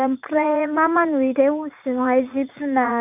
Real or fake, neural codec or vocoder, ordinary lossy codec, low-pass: fake; vocoder, 44.1 kHz, 80 mel bands, Vocos; none; 3.6 kHz